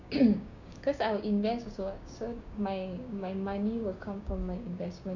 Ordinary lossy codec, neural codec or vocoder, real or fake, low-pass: none; codec, 16 kHz, 6 kbps, DAC; fake; 7.2 kHz